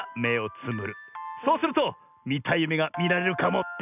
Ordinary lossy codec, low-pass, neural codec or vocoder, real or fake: none; 3.6 kHz; none; real